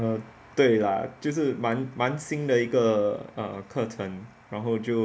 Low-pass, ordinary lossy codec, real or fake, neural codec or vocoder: none; none; real; none